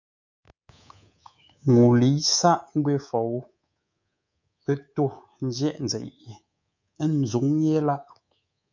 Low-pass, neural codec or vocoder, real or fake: 7.2 kHz; codec, 24 kHz, 3.1 kbps, DualCodec; fake